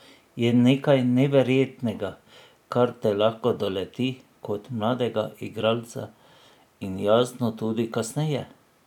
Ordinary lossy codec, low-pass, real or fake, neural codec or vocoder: none; 19.8 kHz; real; none